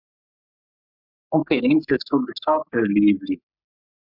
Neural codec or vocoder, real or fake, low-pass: codec, 44.1 kHz, 3.4 kbps, Pupu-Codec; fake; 5.4 kHz